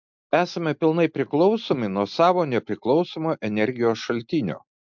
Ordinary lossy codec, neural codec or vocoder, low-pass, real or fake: MP3, 64 kbps; none; 7.2 kHz; real